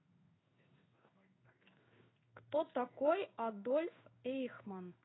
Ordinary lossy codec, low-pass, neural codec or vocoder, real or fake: AAC, 16 kbps; 7.2 kHz; codec, 16 kHz in and 24 kHz out, 1 kbps, XY-Tokenizer; fake